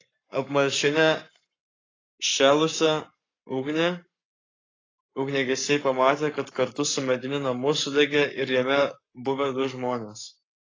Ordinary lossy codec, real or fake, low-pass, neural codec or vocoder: AAC, 32 kbps; fake; 7.2 kHz; codec, 44.1 kHz, 7.8 kbps, Pupu-Codec